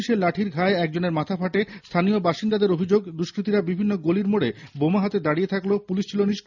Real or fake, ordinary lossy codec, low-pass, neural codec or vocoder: real; none; 7.2 kHz; none